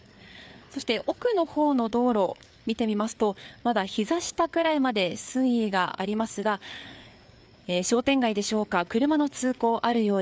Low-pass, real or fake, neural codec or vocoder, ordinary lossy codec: none; fake; codec, 16 kHz, 4 kbps, FreqCodec, larger model; none